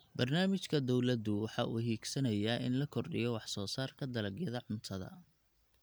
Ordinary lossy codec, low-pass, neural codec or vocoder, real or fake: none; none; none; real